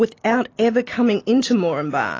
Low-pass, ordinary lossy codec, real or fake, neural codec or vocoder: 7.2 kHz; AAC, 32 kbps; real; none